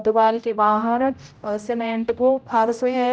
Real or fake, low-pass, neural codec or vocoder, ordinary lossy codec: fake; none; codec, 16 kHz, 0.5 kbps, X-Codec, HuBERT features, trained on general audio; none